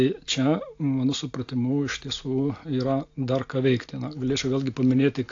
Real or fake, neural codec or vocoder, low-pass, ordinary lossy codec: real; none; 7.2 kHz; AAC, 48 kbps